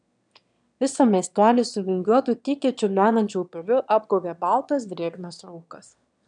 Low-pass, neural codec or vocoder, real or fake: 9.9 kHz; autoencoder, 22.05 kHz, a latent of 192 numbers a frame, VITS, trained on one speaker; fake